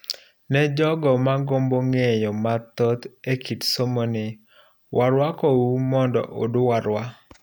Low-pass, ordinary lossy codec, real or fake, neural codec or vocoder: none; none; real; none